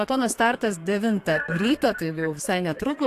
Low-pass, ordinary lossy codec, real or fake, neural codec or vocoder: 14.4 kHz; AAC, 64 kbps; fake; codec, 32 kHz, 1.9 kbps, SNAC